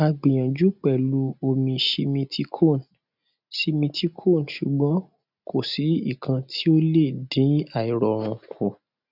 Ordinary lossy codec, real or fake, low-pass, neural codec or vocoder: none; real; 5.4 kHz; none